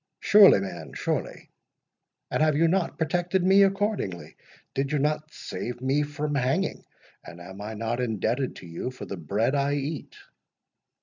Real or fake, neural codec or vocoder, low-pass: real; none; 7.2 kHz